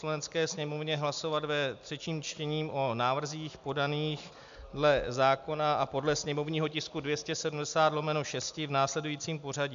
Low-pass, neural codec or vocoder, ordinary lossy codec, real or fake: 7.2 kHz; none; MP3, 64 kbps; real